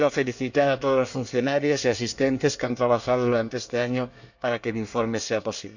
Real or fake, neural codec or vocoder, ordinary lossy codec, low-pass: fake; codec, 24 kHz, 1 kbps, SNAC; none; 7.2 kHz